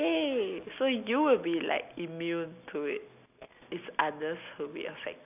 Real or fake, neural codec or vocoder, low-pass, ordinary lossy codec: real; none; 3.6 kHz; none